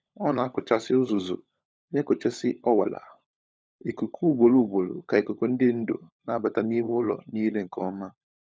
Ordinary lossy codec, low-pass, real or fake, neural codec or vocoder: none; none; fake; codec, 16 kHz, 16 kbps, FunCodec, trained on LibriTTS, 50 frames a second